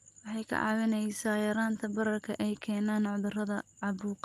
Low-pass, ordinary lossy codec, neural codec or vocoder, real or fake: 14.4 kHz; Opus, 32 kbps; none; real